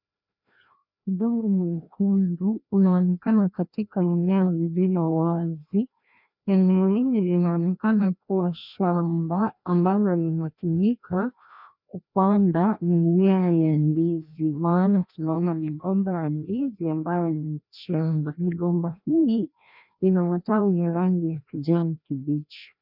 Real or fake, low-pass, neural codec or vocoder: fake; 5.4 kHz; codec, 16 kHz, 1 kbps, FreqCodec, larger model